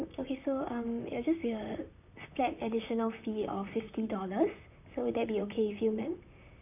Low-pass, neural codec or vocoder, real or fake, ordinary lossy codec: 3.6 kHz; vocoder, 44.1 kHz, 128 mel bands, Pupu-Vocoder; fake; none